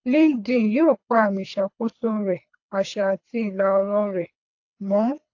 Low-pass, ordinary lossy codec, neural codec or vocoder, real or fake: 7.2 kHz; AAC, 48 kbps; codec, 24 kHz, 3 kbps, HILCodec; fake